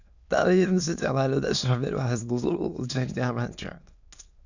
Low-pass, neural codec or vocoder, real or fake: 7.2 kHz; autoencoder, 22.05 kHz, a latent of 192 numbers a frame, VITS, trained on many speakers; fake